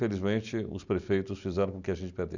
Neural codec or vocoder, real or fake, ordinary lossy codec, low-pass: none; real; none; 7.2 kHz